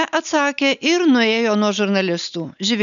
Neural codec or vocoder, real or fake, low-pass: none; real; 7.2 kHz